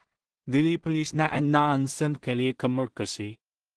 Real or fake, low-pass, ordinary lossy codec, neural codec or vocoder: fake; 10.8 kHz; Opus, 16 kbps; codec, 16 kHz in and 24 kHz out, 0.4 kbps, LongCat-Audio-Codec, two codebook decoder